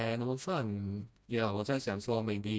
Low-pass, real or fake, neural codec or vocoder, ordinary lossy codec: none; fake; codec, 16 kHz, 1 kbps, FreqCodec, smaller model; none